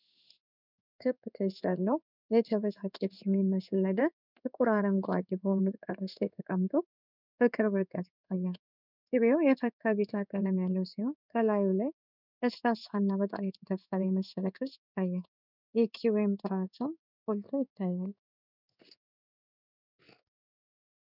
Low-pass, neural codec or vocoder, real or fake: 5.4 kHz; codec, 16 kHz in and 24 kHz out, 1 kbps, XY-Tokenizer; fake